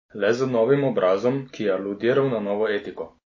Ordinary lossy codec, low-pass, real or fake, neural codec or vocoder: MP3, 32 kbps; 7.2 kHz; real; none